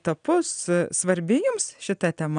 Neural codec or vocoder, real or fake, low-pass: none; real; 9.9 kHz